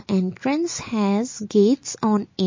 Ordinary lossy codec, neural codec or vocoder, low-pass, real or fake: MP3, 32 kbps; none; 7.2 kHz; real